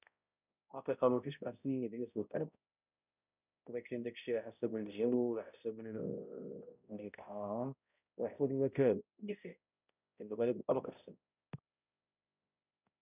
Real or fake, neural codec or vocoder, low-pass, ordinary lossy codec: fake; codec, 16 kHz, 0.5 kbps, X-Codec, HuBERT features, trained on balanced general audio; 3.6 kHz; none